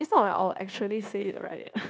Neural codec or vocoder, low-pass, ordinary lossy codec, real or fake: codec, 16 kHz, 0.9 kbps, LongCat-Audio-Codec; none; none; fake